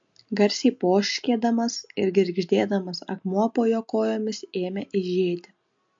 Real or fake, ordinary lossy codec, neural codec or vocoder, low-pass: real; MP3, 48 kbps; none; 7.2 kHz